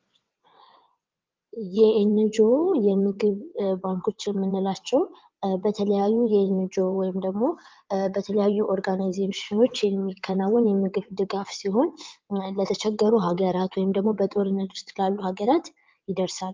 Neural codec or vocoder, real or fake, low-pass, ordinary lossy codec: vocoder, 22.05 kHz, 80 mel bands, WaveNeXt; fake; 7.2 kHz; Opus, 24 kbps